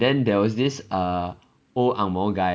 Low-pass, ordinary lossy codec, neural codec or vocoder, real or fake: none; none; none; real